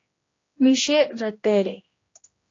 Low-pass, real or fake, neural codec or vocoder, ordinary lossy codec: 7.2 kHz; fake; codec, 16 kHz, 1 kbps, X-Codec, HuBERT features, trained on general audio; AAC, 32 kbps